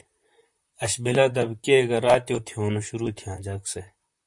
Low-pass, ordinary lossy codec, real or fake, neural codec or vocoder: 10.8 kHz; MP3, 64 kbps; fake; vocoder, 44.1 kHz, 128 mel bands, Pupu-Vocoder